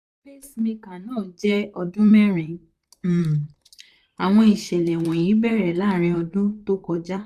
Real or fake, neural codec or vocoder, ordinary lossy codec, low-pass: fake; vocoder, 44.1 kHz, 128 mel bands, Pupu-Vocoder; Opus, 64 kbps; 14.4 kHz